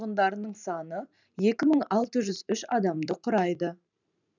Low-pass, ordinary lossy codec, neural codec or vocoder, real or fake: 7.2 kHz; none; vocoder, 44.1 kHz, 128 mel bands every 512 samples, BigVGAN v2; fake